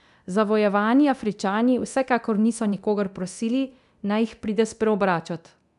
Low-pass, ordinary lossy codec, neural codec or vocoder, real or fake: 10.8 kHz; none; codec, 24 kHz, 0.9 kbps, DualCodec; fake